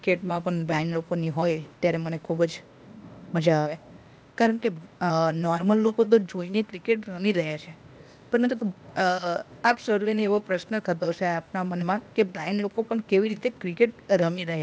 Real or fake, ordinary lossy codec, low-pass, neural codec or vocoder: fake; none; none; codec, 16 kHz, 0.8 kbps, ZipCodec